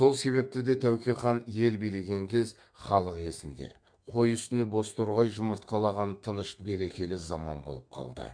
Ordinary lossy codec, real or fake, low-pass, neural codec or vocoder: MP3, 64 kbps; fake; 9.9 kHz; codec, 32 kHz, 1.9 kbps, SNAC